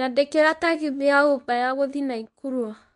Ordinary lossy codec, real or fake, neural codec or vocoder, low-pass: none; fake; codec, 24 kHz, 0.9 kbps, WavTokenizer, medium speech release version 2; 10.8 kHz